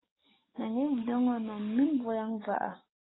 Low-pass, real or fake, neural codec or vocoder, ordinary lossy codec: 7.2 kHz; fake; codec, 44.1 kHz, 7.8 kbps, DAC; AAC, 16 kbps